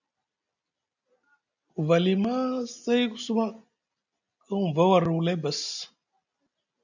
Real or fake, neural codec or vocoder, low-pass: real; none; 7.2 kHz